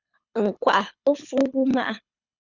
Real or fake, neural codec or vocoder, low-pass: fake; codec, 24 kHz, 6 kbps, HILCodec; 7.2 kHz